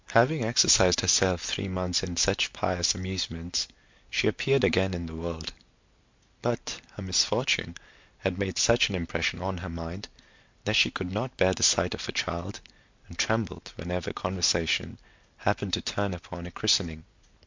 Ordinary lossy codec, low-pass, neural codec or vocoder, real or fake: MP3, 64 kbps; 7.2 kHz; none; real